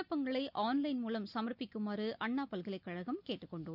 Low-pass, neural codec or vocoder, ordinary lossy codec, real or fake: 5.4 kHz; none; none; real